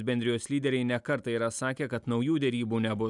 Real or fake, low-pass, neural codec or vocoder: real; 10.8 kHz; none